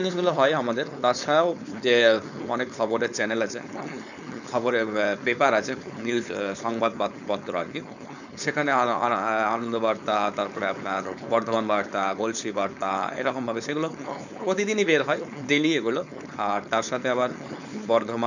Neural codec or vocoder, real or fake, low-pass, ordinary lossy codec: codec, 16 kHz, 4.8 kbps, FACodec; fake; 7.2 kHz; none